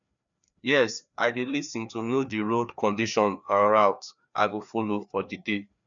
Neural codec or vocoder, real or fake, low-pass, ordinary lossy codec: codec, 16 kHz, 2 kbps, FreqCodec, larger model; fake; 7.2 kHz; MP3, 96 kbps